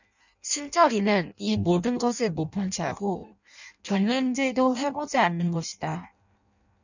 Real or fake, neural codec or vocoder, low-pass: fake; codec, 16 kHz in and 24 kHz out, 0.6 kbps, FireRedTTS-2 codec; 7.2 kHz